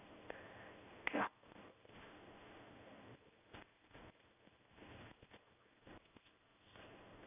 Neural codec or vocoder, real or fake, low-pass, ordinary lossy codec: codec, 16 kHz in and 24 kHz out, 1 kbps, XY-Tokenizer; fake; 3.6 kHz; none